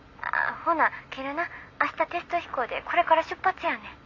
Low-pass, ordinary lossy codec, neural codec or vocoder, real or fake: 7.2 kHz; AAC, 48 kbps; none; real